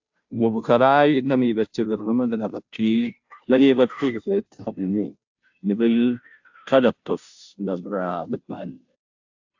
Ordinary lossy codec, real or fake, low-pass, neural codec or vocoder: AAC, 48 kbps; fake; 7.2 kHz; codec, 16 kHz, 0.5 kbps, FunCodec, trained on Chinese and English, 25 frames a second